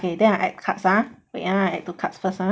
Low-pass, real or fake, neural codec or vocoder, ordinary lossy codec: none; real; none; none